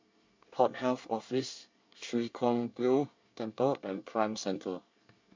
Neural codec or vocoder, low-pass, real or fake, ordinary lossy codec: codec, 24 kHz, 1 kbps, SNAC; 7.2 kHz; fake; MP3, 64 kbps